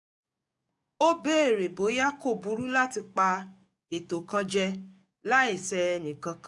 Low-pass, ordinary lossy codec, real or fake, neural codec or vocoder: 10.8 kHz; none; fake; codec, 44.1 kHz, 7.8 kbps, DAC